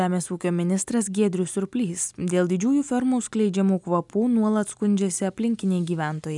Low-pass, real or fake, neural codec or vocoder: 10.8 kHz; real; none